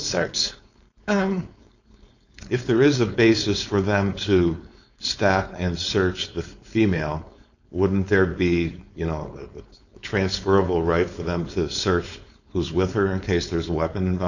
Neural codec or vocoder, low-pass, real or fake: codec, 16 kHz, 4.8 kbps, FACodec; 7.2 kHz; fake